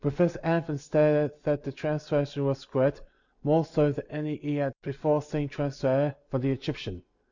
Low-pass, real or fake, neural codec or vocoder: 7.2 kHz; real; none